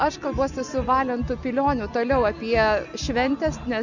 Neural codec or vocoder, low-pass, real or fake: none; 7.2 kHz; real